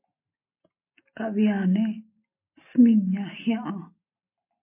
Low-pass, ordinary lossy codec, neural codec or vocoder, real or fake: 3.6 kHz; MP3, 24 kbps; none; real